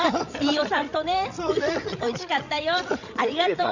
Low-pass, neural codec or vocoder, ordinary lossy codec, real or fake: 7.2 kHz; codec, 16 kHz, 16 kbps, FreqCodec, larger model; none; fake